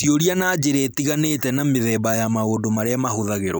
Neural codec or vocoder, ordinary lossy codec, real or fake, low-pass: none; none; real; none